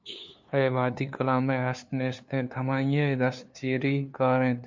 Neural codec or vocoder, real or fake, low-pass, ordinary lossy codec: codec, 16 kHz, 2 kbps, FunCodec, trained on LibriTTS, 25 frames a second; fake; 7.2 kHz; MP3, 48 kbps